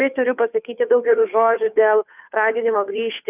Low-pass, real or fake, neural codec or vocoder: 3.6 kHz; fake; codec, 16 kHz, 2 kbps, FunCodec, trained on Chinese and English, 25 frames a second